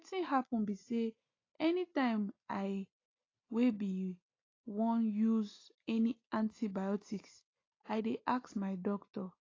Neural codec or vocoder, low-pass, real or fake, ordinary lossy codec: none; 7.2 kHz; real; AAC, 32 kbps